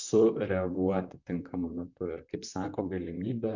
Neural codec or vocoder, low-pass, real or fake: vocoder, 44.1 kHz, 128 mel bands, Pupu-Vocoder; 7.2 kHz; fake